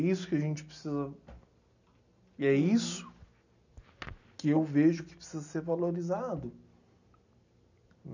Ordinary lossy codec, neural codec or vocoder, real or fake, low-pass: none; none; real; 7.2 kHz